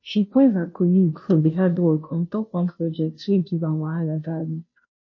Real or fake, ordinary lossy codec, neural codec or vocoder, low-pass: fake; MP3, 32 kbps; codec, 16 kHz, 0.5 kbps, FunCodec, trained on Chinese and English, 25 frames a second; 7.2 kHz